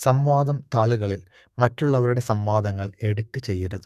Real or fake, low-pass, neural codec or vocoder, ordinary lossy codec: fake; 14.4 kHz; codec, 32 kHz, 1.9 kbps, SNAC; none